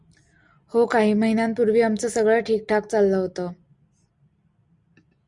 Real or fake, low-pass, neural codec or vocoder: real; 10.8 kHz; none